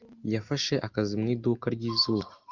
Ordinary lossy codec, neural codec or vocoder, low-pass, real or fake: Opus, 24 kbps; none; 7.2 kHz; real